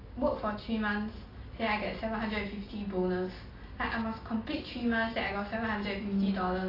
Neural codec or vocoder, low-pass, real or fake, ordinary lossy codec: none; 5.4 kHz; real; AAC, 24 kbps